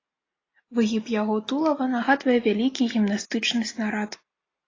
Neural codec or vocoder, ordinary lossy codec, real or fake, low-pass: none; AAC, 32 kbps; real; 7.2 kHz